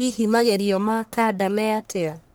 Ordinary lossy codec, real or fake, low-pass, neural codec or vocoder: none; fake; none; codec, 44.1 kHz, 1.7 kbps, Pupu-Codec